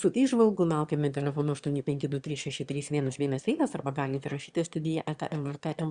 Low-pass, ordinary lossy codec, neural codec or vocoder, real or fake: 9.9 kHz; Opus, 64 kbps; autoencoder, 22.05 kHz, a latent of 192 numbers a frame, VITS, trained on one speaker; fake